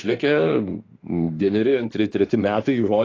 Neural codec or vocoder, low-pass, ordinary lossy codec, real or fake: autoencoder, 48 kHz, 32 numbers a frame, DAC-VAE, trained on Japanese speech; 7.2 kHz; AAC, 32 kbps; fake